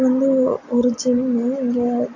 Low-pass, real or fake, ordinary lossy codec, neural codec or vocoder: 7.2 kHz; real; AAC, 48 kbps; none